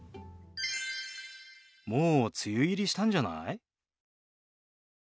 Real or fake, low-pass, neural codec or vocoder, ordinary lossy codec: real; none; none; none